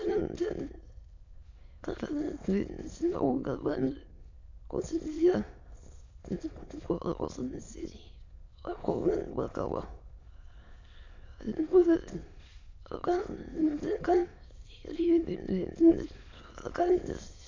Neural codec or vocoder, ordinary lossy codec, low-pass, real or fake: autoencoder, 22.05 kHz, a latent of 192 numbers a frame, VITS, trained on many speakers; AAC, 48 kbps; 7.2 kHz; fake